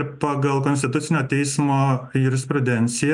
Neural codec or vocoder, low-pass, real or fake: none; 10.8 kHz; real